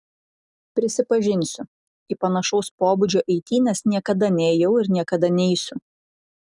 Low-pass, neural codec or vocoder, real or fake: 10.8 kHz; none; real